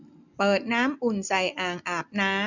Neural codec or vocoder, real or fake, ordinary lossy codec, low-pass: none; real; none; 7.2 kHz